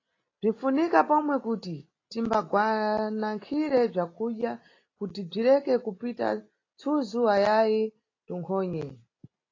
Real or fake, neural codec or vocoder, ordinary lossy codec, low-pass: real; none; AAC, 32 kbps; 7.2 kHz